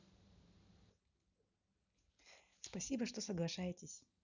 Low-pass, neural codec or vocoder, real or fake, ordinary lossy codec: 7.2 kHz; none; real; none